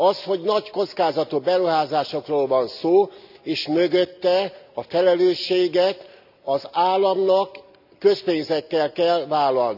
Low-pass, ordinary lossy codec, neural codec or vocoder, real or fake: 5.4 kHz; none; none; real